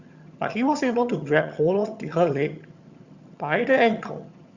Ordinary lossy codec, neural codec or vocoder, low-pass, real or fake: Opus, 64 kbps; vocoder, 22.05 kHz, 80 mel bands, HiFi-GAN; 7.2 kHz; fake